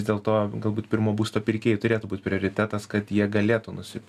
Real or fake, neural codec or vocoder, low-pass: real; none; 14.4 kHz